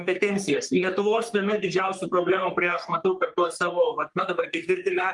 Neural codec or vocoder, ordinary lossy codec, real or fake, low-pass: codec, 44.1 kHz, 3.4 kbps, Pupu-Codec; Opus, 32 kbps; fake; 10.8 kHz